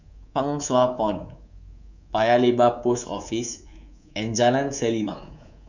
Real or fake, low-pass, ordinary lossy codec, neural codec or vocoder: fake; 7.2 kHz; none; codec, 24 kHz, 3.1 kbps, DualCodec